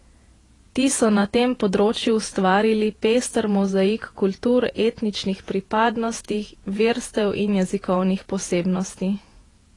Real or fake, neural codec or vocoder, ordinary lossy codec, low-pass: fake; vocoder, 48 kHz, 128 mel bands, Vocos; AAC, 32 kbps; 10.8 kHz